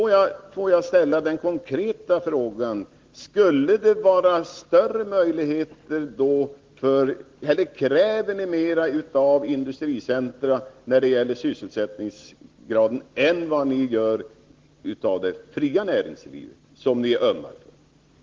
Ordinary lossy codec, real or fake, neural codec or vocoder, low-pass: Opus, 16 kbps; real; none; 7.2 kHz